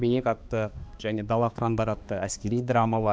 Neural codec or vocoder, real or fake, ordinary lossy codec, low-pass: codec, 16 kHz, 2 kbps, X-Codec, HuBERT features, trained on balanced general audio; fake; none; none